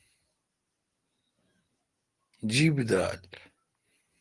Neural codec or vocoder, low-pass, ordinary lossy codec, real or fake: none; 10.8 kHz; Opus, 24 kbps; real